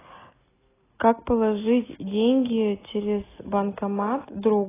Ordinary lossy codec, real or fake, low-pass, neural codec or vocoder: AAC, 16 kbps; real; 3.6 kHz; none